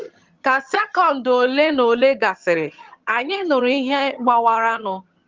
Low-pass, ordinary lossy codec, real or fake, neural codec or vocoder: 7.2 kHz; Opus, 32 kbps; fake; vocoder, 22.05 kHz, 80 mel bands, HiFi-GAN